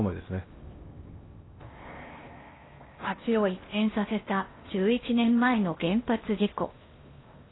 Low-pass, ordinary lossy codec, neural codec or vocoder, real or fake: 7.2 kHz; AAC, 16 kbps; codec, 16 kHz in and 24 kHz out, 0.8 kbps, FocalCodec, streaming, 65536 codes; fake